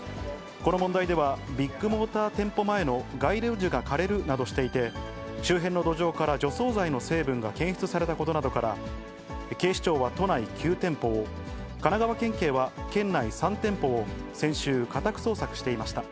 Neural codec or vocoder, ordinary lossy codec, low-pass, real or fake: none; none; none; real